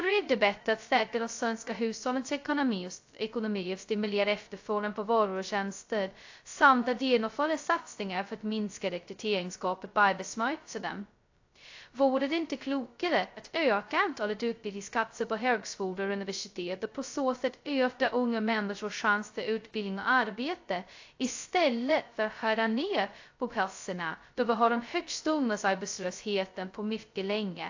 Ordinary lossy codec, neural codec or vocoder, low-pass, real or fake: AAC, 48 kbps; codec, 16 kHz, 0.2 kbps, FocalCodec; 7.2 kHz; fake